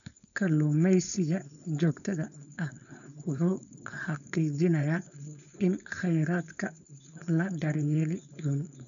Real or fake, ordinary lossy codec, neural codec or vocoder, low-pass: fake; none; codec, 16 kHz, 4.8 kbps, FACodec; 7.2 kHz